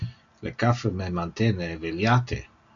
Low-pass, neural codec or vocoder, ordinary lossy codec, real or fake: 7.2 kHz; none; AAC, 48 kbps; real